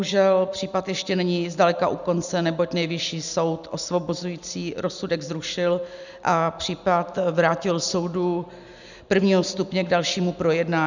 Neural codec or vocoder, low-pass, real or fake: none; 7.2 kHz; real